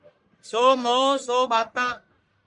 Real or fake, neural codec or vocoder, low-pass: fake; codec, 44.1 kHz, 1.7 kbps, Pupu-Codec; 10.8 kHz